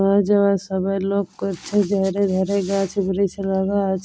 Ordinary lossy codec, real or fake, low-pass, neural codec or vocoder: none; real; none; none